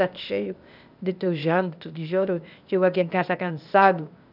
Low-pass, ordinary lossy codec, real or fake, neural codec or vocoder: 5.4 kHz; none; fake; codec, 16 kHz, 0.8 kbps, ZipCodec